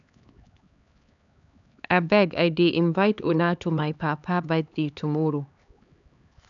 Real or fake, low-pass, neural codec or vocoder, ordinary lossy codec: fake; 7.2 kHz; codec, 16 kHz, 4 kbps, X-Codec, HuBERT features, trained on LibriSpeech; none